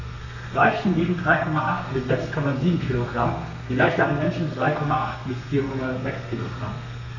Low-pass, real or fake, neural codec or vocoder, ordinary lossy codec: 7.2 kHz; fake; codec, 32 kHz, 1.9 kbps, SNAC; none